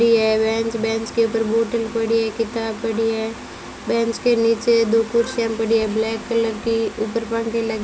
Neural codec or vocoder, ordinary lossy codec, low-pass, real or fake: none; none; none; real